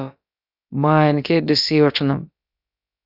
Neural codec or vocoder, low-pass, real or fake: codec, 16 kHz, about 1 kbps, DyCAST, with the encoder's durations; 5.4 kHz; fake